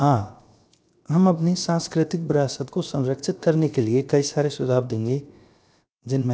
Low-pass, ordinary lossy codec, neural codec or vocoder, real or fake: none; none; codec, 16 kHz, 0.7 kbps, FocalCodec; fake